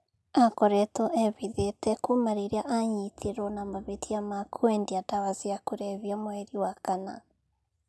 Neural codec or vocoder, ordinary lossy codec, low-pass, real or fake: none; none; none; real